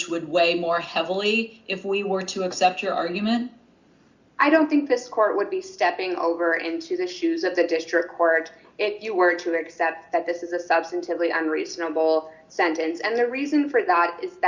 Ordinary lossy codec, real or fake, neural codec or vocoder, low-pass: Opus, 64 kbps; real; none; 7.2 kHz